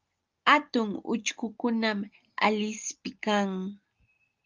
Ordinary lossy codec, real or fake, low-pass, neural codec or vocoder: Opus, 24 kbps; real; 7.2 kHz; none